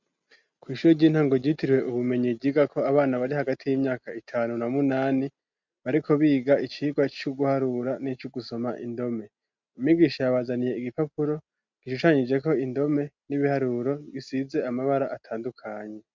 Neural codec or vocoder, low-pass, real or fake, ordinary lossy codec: none; 7.2 kHz; real; MP3, 64 kbps